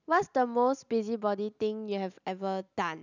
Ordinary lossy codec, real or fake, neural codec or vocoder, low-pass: none; real; none; 7.2 kHz